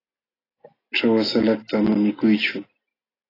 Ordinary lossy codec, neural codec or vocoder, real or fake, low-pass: AAC, 24 kbps; none; real; 5.4 kHz